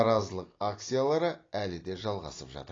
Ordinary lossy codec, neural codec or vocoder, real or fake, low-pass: AAC, 32 kbps; none; real; 7.2 kHz